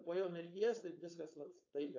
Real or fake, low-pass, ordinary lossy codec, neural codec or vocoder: fake; 7.2 kHz; AAC, 48 kbps; codec, 16 kHz, 4.8 kbps, FACodec